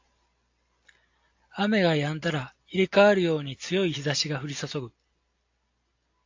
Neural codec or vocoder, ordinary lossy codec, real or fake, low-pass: none; AAC, 48 kbps; real; 7.2 kHz